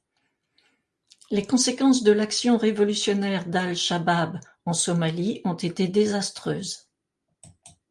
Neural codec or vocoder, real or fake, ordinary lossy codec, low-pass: none; real; Opus, 32 kbps; 10.8 kHz